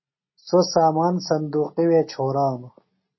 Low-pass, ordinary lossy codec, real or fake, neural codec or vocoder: 7.2 kHz; MP3, 24 kbps; real; none